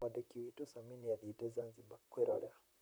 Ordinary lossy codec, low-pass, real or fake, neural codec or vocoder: none; none; fake; vocoder, 44.1 kHz, 128 mel bands, Pupu-Vocoder